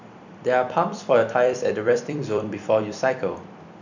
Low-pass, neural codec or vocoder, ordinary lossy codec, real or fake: 7.2 kHz; vocoder, 44.1 kHz, 128 mel bands every 256 samples, BigVGAN v2; none; fake